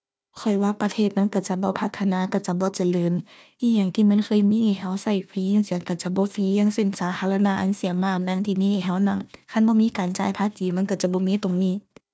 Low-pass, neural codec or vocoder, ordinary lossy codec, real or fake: none; codec, 16 kHz, 1 kbps, FunCodec, trained on Chinese and English, 50 frames a second; none; fake